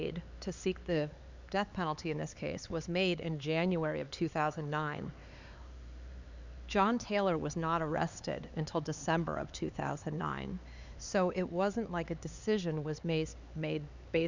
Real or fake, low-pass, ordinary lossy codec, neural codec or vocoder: fake; 7.2 kHz; Opus, 64 kbps; codec, 16 kHz, 4 kbps, X-Codec, HuBERT features, trained on LibriSpeech